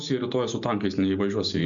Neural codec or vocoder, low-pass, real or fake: none; 7.2 kHz; real